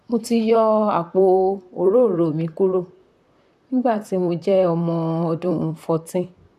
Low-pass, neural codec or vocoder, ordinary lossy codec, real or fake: 14.4 kHz; vocoder, 44.1 kHz, 128 mel bands, Pupu-Vocoder; none; fake